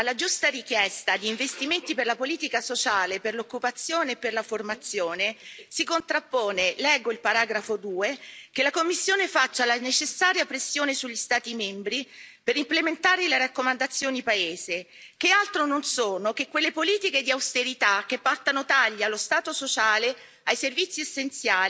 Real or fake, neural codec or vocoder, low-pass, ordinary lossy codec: real; none; none; none